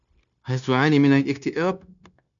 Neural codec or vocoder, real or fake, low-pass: codec, 16 kHz, 0.9 kbps, LongCat-Audio-Codec; fake; 7.2 kHz